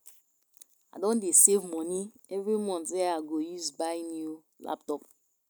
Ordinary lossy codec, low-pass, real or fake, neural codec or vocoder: none; none; real; none